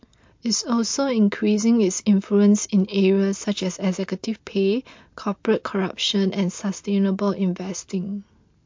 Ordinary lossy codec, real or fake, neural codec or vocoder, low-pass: MP3, 48 kbps; real; none; 7.2 kHz